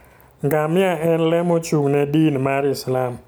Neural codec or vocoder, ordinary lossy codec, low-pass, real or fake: none; none; none; real